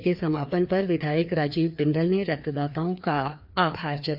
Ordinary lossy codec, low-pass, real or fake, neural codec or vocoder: none; 5.4 kHz; fake; codec, 16 kHz, 2 kbps, FreqCodec, larger model